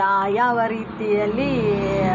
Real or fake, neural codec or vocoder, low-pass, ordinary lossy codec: real; none; 7.2 kHz; none